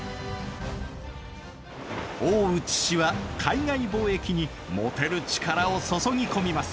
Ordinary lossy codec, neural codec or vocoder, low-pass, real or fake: none; none; none; real